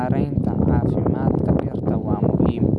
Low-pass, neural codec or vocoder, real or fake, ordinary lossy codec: none; none; real; none